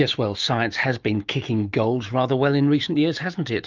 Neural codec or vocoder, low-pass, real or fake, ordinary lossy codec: autoencoder, 48 kHz, 128 numbers a frame, DAC-VAE, trained on Japanese speech; 7.2 kHz; fake; Opus, 24 kbps